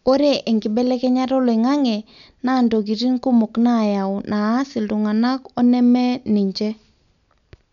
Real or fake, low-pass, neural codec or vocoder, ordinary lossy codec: real; 7.2 kHz; none; none